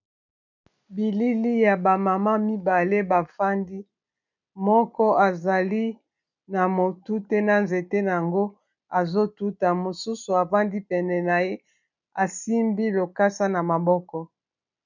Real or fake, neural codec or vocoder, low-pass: real; none; 7.2 kHz